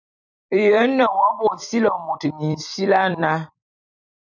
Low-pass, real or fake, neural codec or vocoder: 7.2 kHz; fake; vocoder, 44.1 kHz, 128 mel bands every 256 samples, BigVGAN v2